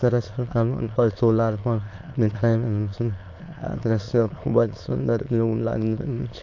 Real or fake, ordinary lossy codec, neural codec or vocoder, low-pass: fake; AAC, 48 kbps; autoencoder, 22.05 kHz, a latent of 192 numbers a frame, VITS, trained on many speakers; 7.2 kHz